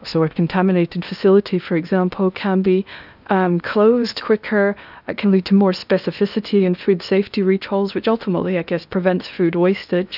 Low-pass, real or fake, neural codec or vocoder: 5.4 kHz; fake; codec, 16 kHz in and 24 kHz out, 0.8 kbps, FocalCodec, streaming, 65536 codes